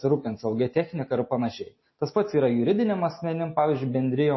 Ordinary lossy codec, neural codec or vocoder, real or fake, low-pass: MP3, 24 kbps; none; real; 7.2 kHz